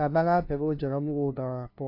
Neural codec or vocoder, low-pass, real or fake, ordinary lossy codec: codec, 16 kHz, 0.5 kbps, FunCodec, trained on LibriTTS, 25 frames a second; 5.4 kHz; fake; AAC, 32 kbps